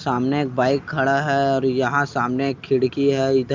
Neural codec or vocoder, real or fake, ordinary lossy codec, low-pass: none; real; Opus, 24 kbps; 7.2 kHz